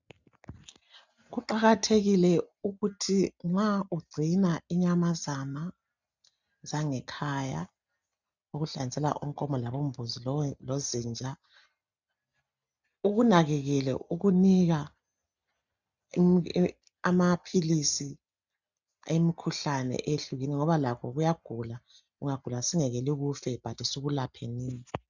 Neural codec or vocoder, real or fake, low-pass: none; real; 7.2 kHz